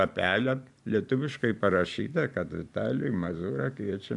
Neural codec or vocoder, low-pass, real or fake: vocoder, 44.1 kHz, 128 mel bands every 512 samples, BigVGAN v2; 10.8 kHz; fake